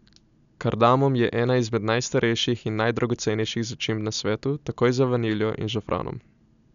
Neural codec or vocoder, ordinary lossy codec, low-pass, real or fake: none; none; 7.2 kHz; real